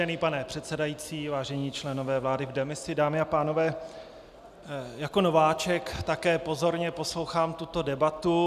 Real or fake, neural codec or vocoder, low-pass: real; none; 14.4 kHz